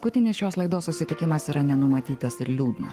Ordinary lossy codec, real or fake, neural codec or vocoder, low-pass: Opus, 24 kbps; fake; codec, 44.1 kHz, 7.8 kbps, Pupu-Codec; 14.4 kHz